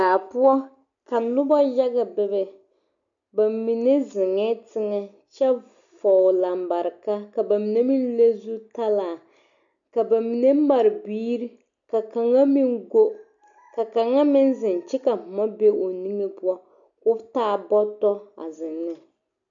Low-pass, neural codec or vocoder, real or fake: 7.2 kHz; none; real